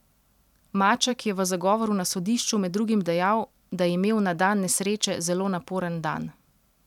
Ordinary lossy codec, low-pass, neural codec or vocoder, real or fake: none; 19.8 kHz; vocoder, 44.1 kHz, 128 mel bands every 256 samples, BigVGAN v2; fake